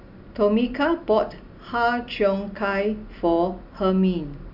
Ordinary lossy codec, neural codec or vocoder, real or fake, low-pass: none; none; real; 5.4 kHz